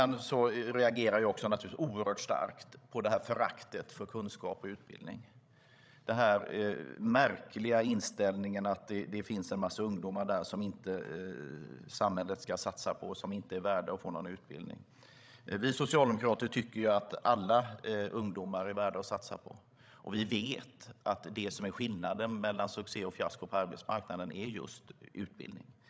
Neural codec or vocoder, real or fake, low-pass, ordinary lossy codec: codec, 16 kHz, 16 kbps, FreqCodec, larger model; fake; none; none